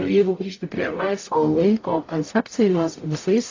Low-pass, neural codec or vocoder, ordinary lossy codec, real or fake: 7.2 kHz; codec, 44.1 kHz, 0.9 kbps, DAC; AAC, 32 kbps; fake